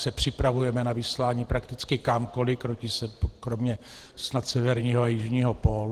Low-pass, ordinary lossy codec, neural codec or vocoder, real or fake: 14.4 kHz; Opus, 16 kbps; vocoder, 48 kHz, 128 mel bands, Vocos; fake